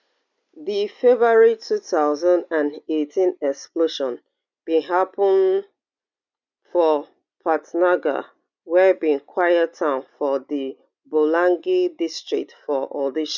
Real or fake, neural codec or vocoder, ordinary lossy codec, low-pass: real; none; none; 7.2 kHz